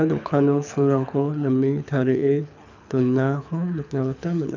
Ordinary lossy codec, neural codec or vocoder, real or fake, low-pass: none; codec, 24 kHz, 6 kbps, HILCodec; fake; 7.2 kHz